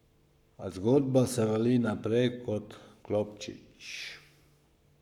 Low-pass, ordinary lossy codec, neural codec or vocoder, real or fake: 19.8 kHz; none; codec, 44.1 kHz, 7.8 kbps, Pupu-Codec; fake